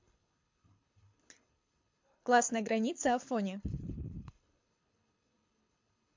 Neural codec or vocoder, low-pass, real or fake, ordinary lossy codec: codec, 24 kHz, 6 kbps, HILCodec; 7.2 kHz; fake; MP3, 48 kbps